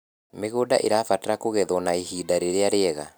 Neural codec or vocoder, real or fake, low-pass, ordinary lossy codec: none; real; none; none